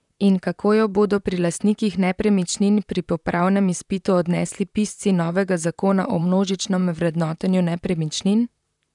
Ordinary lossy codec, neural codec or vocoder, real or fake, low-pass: none; vocoder, 44.1 kHz, 128 mel bands, Pupu-Vocoder; fake; 10.8 kHz